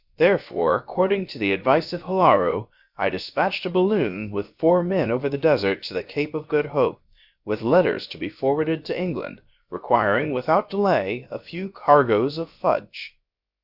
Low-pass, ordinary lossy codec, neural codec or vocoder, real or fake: 5.4 kHz; Opus, 64 kbps; codec, 16 kHz, about 1 kbps, DyCAST, with the encoder's durations; fake